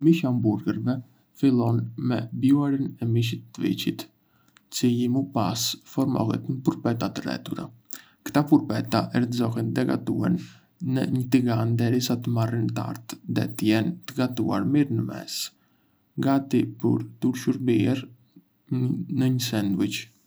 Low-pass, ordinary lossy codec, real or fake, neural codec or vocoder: none; none; real; none